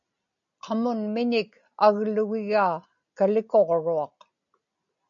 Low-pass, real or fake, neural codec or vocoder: 7.2 kHz; real; none